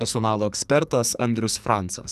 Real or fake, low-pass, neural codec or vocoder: fake; 14.4 kHz; codec, 44.1 kHz, 2.6 kbps, SNAC